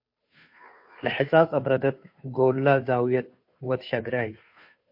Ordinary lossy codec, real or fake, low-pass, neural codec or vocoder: MP3, 32 kbps; fake; 5.4 kHz; codec, 16 kHz, 2 kbps, FunCodec, trained on Chinese and English, 25 frames a second